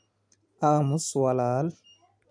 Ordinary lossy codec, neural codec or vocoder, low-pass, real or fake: none; none; 9.9 kHz; real